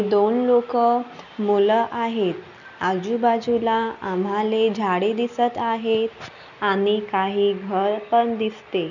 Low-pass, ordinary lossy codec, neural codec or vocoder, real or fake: 7.2 kHz; none; none; real